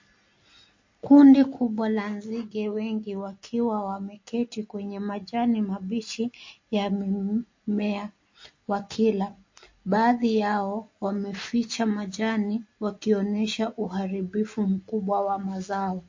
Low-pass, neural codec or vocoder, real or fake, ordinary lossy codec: 7.2 kHz; none; real; MP3, 32 kbps